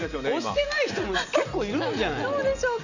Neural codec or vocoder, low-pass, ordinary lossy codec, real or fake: none; 7.2 kHz; none; real